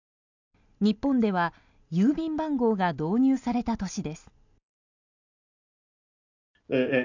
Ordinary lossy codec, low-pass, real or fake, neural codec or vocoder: none; 7.2 kHz; real; none